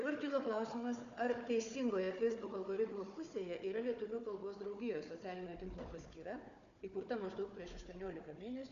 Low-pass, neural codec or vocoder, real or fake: 7.2 kHz; codec, 16 kHz, 4 kbps, FunCodec, trained on Chinese and English, 50 frames a second; fake